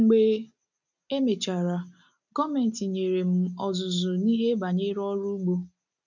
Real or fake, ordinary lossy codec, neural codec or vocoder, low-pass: real; none; none; 7.2 kHz